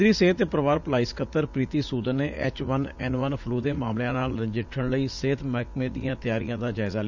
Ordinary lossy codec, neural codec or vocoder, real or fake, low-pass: none; vocoder, 44.1 kHz, 80 mel bands, Vocos; fake; 7.2 kHz